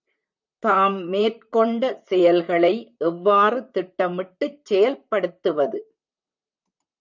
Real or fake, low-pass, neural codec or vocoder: fake; 7.2 kHz; vocoder, 44.1 kHz, 128 mel bands, Pupu-Vocoder